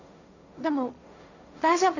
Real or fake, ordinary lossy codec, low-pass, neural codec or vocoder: fake; none; 7.2 kHz; codec, 16 kHz, 1.1 kbps, Voila-Tokenizer